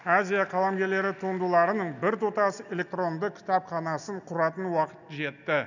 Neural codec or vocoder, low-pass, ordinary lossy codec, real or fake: none; 7.2 kHz; none; real